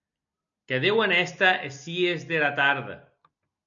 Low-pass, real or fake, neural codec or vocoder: 7.2 kHz; real; none